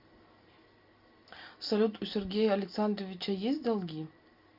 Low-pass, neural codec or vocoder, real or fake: 5.4 kHz; none; real